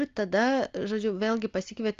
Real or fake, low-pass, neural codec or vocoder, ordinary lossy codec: real; 7.2 kHz; none; Opus, 32 kbps